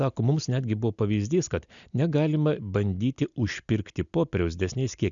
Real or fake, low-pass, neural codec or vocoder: real; 7.2 kHz; none